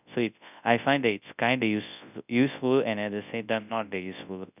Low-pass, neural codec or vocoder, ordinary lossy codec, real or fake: 3.6 kHz; codec, 24 kHz, 0.9 kbps, WavTokenizer, large speech release; none; fake